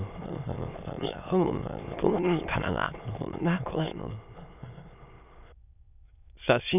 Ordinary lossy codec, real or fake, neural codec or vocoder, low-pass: none; fake; autoencoder, 22.05 kHz, a latent of 192 numbers a frame, VITS, trained on many speakers; 3.6 kHz